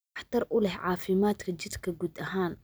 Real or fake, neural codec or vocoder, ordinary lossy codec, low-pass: real; none; none; none